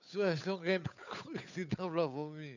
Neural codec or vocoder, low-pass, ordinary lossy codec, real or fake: codec, 16 kHz, 8 kbps, FunCodec, trained on Chinese and English, 25 frames a second; 7.2 kHz; none; fake